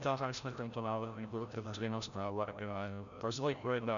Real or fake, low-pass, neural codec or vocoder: fake; 7.2 kHz; codec, 16 kHz, 0.5 kbps, FreqCodec, larger model